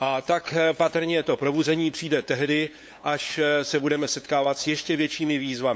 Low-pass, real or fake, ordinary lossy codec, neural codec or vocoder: none; fake; none; codec, 16 kHz, 8 kbps, FunCodec, trained on LibriTTS, 25 frames a second